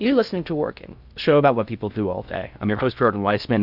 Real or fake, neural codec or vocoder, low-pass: fake; codec, 16 kHz in and 24 kHz out, 0.6 kbps, FocalCodec, streaming, 2048 codes; 5.4 kHz